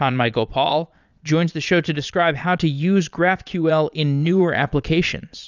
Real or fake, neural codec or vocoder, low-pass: fake; vocoder, 22.05 kHz, 80 mel bands, Vocos; 7.2 kHz